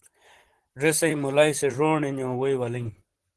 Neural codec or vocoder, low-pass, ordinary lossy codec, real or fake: vocoder, 44.1 kHz, 128 mel bands, Pupu-Vocoder; 10.8 kHz; Opus, 16 kbps; fake